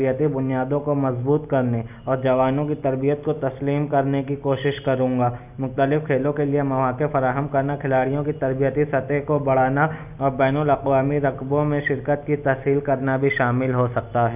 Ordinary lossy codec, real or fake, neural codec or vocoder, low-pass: none; real; none; 3.6 kHz